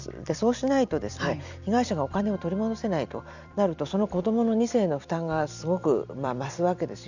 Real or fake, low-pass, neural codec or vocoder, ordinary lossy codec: fake; 7.2 kHz; vocoder, 44.1 kHz, 128 mel bands every 256 samples, BigVGAN v2; none